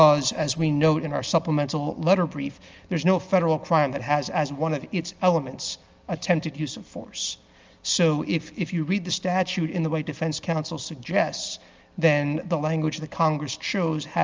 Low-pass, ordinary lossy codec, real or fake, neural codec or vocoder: 7.2 kHz; Opus, 24 kbps; real; none